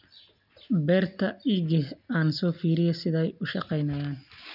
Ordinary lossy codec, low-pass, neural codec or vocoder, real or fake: none; 5.4 kHz; none; real